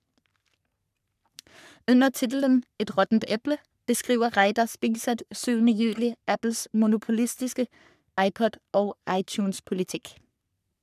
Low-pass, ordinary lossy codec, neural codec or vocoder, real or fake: 14.4 kHz; none; codec, 44.1 kHz, 3.4 kbps, Pupu-Codec; fake